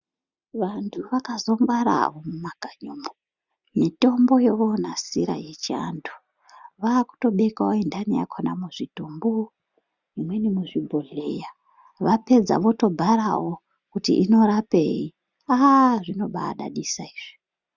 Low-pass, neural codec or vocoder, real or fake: 7.2 kHz; none; real